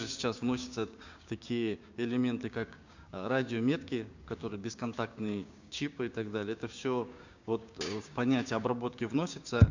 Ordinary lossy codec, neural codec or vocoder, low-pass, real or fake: Opus, 64 kbps; codec, 16 kHz, 6 kbps, DAC; 7.2 kHz; fake